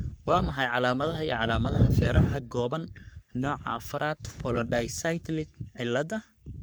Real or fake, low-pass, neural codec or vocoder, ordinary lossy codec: fake; none; codec, 44.1 kHz, 3.4 kbps, Pupu-Codec; none